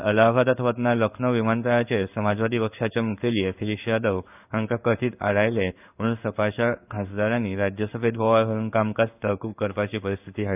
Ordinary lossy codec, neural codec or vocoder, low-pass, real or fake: none; codec, 16 kHz in and 24 kHz out, 1 kbps, XY-Tokenizer; 3.6 kHz; fake